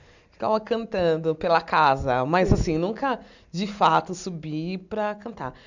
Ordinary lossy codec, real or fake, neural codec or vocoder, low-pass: none; real; none; 7.2 kHz